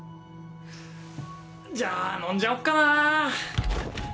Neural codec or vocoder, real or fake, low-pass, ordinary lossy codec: none; real; none; none